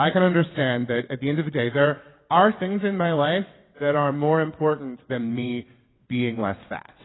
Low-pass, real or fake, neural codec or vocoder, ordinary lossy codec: 7.2 kHz; fake; vocoder, 22.05 kHz, 80 mel bands, WaveNeXt; AAC, 16 kbps